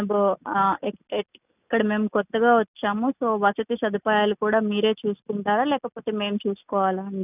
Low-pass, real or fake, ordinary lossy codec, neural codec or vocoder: 3.6 kHz; real; none; none